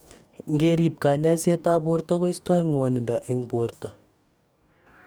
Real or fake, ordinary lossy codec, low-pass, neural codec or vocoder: fake; none; none; codec, 44.1 kHz, 2.6 kbps, DAC